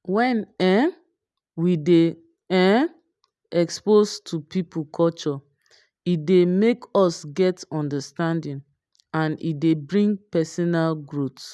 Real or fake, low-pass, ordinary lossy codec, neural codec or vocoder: real; none; none; none